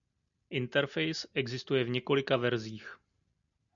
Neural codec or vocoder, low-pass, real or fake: none; 7.2 kHz; real